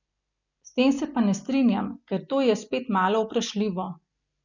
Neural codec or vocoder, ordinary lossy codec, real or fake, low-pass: none; none; real; 7.2 kHz